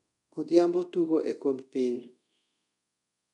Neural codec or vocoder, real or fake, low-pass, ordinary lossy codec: codec, 24 kHz, 0.5 kbps, DualCodec; fake; 10.8 kHz; none